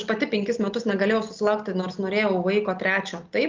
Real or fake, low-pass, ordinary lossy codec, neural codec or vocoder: real; 7.2 kHz; Opus, 24 kbps; none